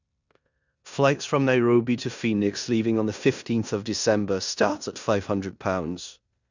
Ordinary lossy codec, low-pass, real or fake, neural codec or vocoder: none; 7.2 kHz; fake; codec, 16 kHz in and 24 kHz out, 0.9 kbps, LongCat-Audio-Codec, four codebook decoder